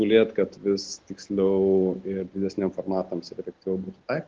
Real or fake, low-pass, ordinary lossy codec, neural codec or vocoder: real; 7.2 kHz; Opus, 16 kbps; none